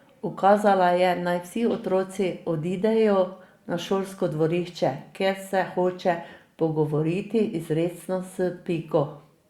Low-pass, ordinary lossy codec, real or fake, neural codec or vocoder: 19.8 kHz; Opus, 64 kbps; real; none